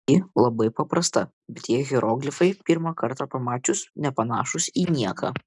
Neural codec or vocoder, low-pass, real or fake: none; 10.8 kHz; real